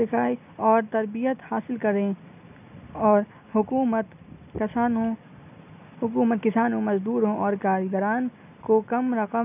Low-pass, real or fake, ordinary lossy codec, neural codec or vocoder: 3.6 kHz; real; none; none